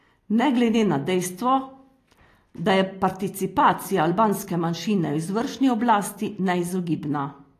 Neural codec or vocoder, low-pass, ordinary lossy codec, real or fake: none; 14.4 kHz; AAC, 48 kbps; real